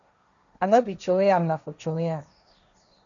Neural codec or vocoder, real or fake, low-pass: codec, 16 kHz, 1.1 kbps, Voila-Tokenizer; fake; 7.2 kHz